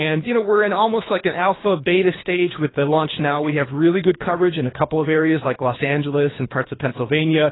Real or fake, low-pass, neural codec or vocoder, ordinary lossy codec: fake; 7.2 kHz; codec, 24 kHz, 3 kbps, HILCodec; AAC, 16 kbps